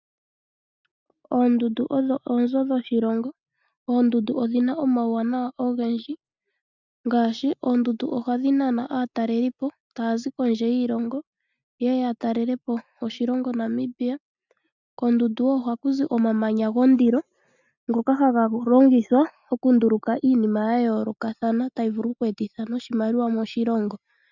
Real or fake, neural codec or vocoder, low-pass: real; none; 7.2 kHz